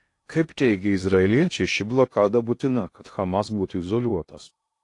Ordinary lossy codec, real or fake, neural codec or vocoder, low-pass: AAC, 48 kbps; fake; codec, 16 kHz in and 24 kHz out, 0.6 kbps, FocalCodec, streaming, 2048 codes; 10.8 kHz